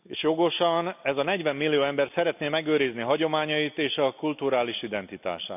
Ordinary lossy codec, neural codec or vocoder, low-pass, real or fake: none; none; 3.6 kHz; real